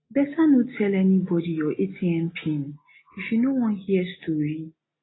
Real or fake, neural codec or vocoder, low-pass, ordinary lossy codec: real; none; 7.2 kHz; AAC, 16 kbps